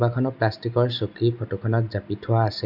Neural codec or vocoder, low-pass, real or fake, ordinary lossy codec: none; 5.4 kHz; real; none